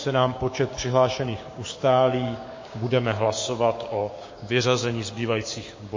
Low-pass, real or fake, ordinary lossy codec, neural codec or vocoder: 7.2 kHz; fake; MP3, 32 kbps; codec, 16 kHz, 6 kbps, DAC